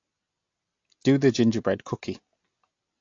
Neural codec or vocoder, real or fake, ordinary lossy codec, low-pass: none; real; AAC, 48 kbps; 7.2 kHz